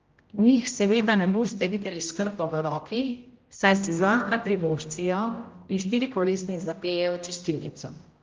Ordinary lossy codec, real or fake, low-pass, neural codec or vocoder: Opus, 24 kbps; fake; 7.2 kHz; codec, 16 kHz, 0.5 kbps, X-Codec, HuBERT features, trained on general audio